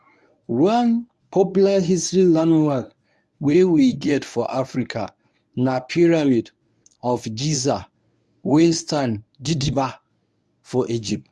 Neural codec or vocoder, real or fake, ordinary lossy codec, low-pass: codec, 24 kHz, 0.9 kbps, WavTokenizer, medium speech release version 1; fake; none; none